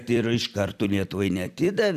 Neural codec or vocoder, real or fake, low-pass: vocoder, 44.1 kHz, 128 mel bands every 256 samples, BigVGAN v2; fake; 14.4 kHz